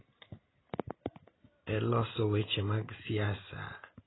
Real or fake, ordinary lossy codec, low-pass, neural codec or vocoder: real; AAC, 16 kbps; 7.2 kHz; none